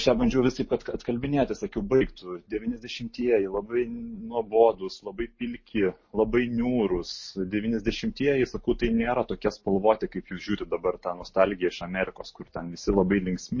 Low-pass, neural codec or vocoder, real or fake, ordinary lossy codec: 7.2 kHz; none; real; MP3, 32 kbps